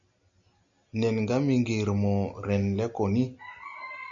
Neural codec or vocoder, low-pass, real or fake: none; 7.2 kHz; real